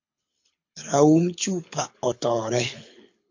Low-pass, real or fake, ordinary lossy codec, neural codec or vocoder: 7.2 kHz; fake; MP3, 48 kbps; codec, 24 kHz, 6 kbps, HILCodec